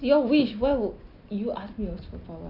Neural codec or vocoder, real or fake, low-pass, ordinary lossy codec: none; real; 5.4 kHz; none